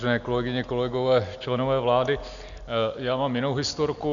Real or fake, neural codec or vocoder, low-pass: real; none; 7.2 kHz